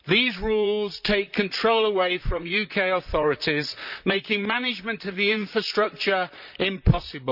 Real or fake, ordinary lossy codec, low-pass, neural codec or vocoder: fake; none; 5.4 kHz; vocoder, 44.1 kHz, 128 mel bands, Pupu-Vocoder